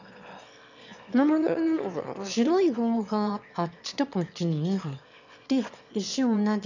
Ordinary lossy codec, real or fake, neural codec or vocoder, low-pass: none; fake; autoencoder, 22.05 kHz, a latent of 192 numbers a frame, VITS, trained on one speaker; 7.2 kHz